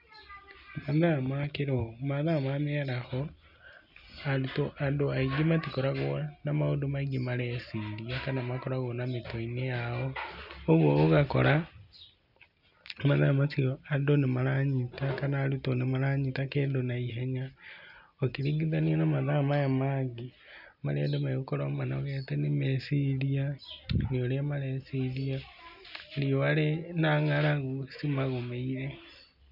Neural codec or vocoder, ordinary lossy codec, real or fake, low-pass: none; none; real; 5.4 kHz